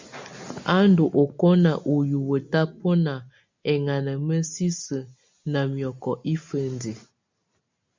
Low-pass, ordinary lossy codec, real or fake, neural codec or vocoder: 7.2 kHz; MP3, 64 kbps; real; none